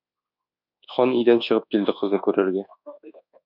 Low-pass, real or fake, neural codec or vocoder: 5.4 kHz; fake; codec, 24 kHz, 1.2 kbps, DualCodec